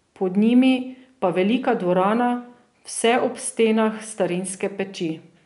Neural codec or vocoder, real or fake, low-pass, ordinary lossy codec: none; real; 10.8 kHz; none